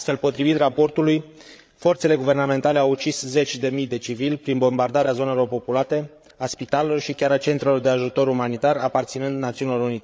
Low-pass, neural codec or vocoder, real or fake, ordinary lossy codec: none; codec, 16 kHz, 8 kbps, FreqCodec, larger model; fake; none